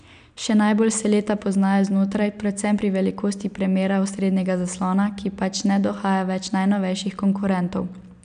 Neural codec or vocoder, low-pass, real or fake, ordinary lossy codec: none; 9.9 kHz; real; none